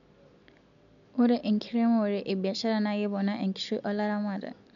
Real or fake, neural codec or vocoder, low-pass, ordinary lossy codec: real; none; 7.2 kHz; none